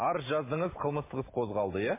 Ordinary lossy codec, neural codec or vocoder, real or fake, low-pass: MP3, 16 kbps; none; real; 3.6 kHz